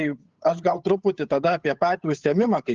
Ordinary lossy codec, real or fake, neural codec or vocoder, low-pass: Opus, 24 kbps; fake; codec, 16 kHz, 8 kbps, FunCodec, trained on Chinese and English, 25 frames a second; 7.2 kHz